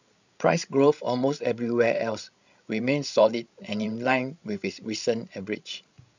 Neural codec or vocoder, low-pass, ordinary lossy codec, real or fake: codec, 16 kHz, 16 kbps, FreqCodec, larger model; 7.2 kHz; none; fake